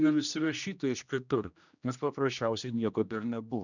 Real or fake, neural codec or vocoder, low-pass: fake; codec, 16 kHz, 1 kbps, X-Codec, HuBERT features, trained on general audio; 7.2 kHz